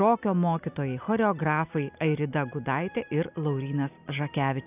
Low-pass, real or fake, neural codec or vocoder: 3.6 kHz; real; none